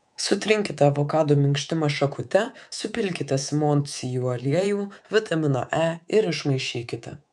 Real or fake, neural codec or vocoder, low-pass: fake; codec, 24 kHz, 3.1 kbps, DualCodec; 10.8 kHz